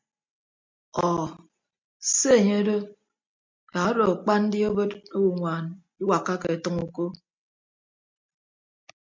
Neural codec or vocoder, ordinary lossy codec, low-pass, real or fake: none; MP3, 64 kbps; 7.2 kHz; real